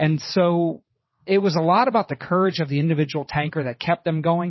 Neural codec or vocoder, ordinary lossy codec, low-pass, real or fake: vocoder, 22.05 kHz, 80 mel bands, WaveNeXt; MP3, 24 kbps; 7.2 kHz; fake